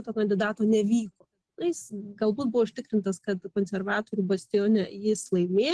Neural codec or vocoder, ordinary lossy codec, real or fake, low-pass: none; Opus, 16 kbps; real; 10.8 kHz